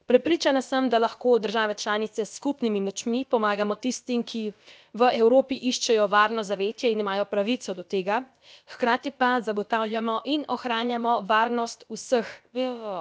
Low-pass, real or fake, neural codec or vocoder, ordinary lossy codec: none; fake; codec, 16 kHz, about 1 kbps, DyCAST, with the encoder's durations; none